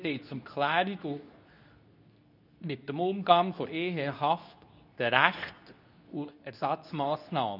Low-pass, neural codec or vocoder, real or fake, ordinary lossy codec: 5.4 kHz; codec, 24 kHz, 0.9 kbps, WavTokenizer, medium speech release version 2; fake; none